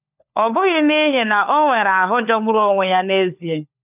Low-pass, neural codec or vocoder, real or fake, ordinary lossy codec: 3.6 kHz; codec, 16 kHz, 4 kbps, FunCodec, trained on LibriTTS, 50 frames a second; fake; none